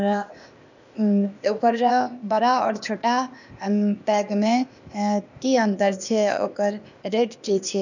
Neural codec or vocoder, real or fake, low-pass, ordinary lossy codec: codec, 16 kHz, 0.8 kbps, ZipCodec; fake; 7.2 kHz; none